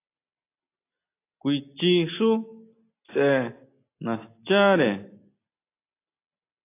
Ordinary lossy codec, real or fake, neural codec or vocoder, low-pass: AAC, 24 kbps; real; none; 3.6 kHz